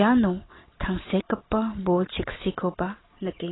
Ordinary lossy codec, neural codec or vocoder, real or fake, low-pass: AAC, 16 kbps; vocoder, 44.1 kHz, 128 mel bands every 512 samples, BigVGAN v2; fake; 7.2 kHz